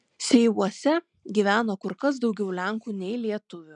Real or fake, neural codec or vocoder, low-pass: real; none; 9.9 kHz